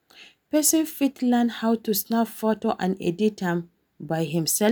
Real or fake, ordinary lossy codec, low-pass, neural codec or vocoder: real; none; none; none